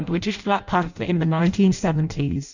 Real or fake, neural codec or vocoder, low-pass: fake; codec, 16 kHz in and 24 kHz out, 0.6 kbps, FireRedTTS-2 codec; 7.2 kHz